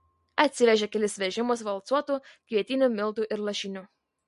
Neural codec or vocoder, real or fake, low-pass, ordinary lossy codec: none; real; 14.4 kHz; MP3, 48 kbps